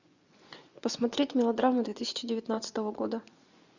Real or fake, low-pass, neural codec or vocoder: fake; 7.2 kHz; vocoder, 24 kHz, 100 mel bands, Vocos